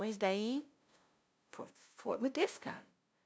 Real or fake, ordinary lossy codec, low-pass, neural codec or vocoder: fake; none; none; codec, 16 kHz, 0.5 kbps, FunCodec, trained on LibriTTS, 25 frames a second